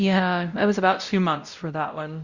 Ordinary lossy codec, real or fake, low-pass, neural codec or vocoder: Opus, 64 kbps; fake; 7.2 kHz; codec, 16 kHz, 0.5 kbps, X-Codec, WavLM features, trained on Multilingual LibriSpeech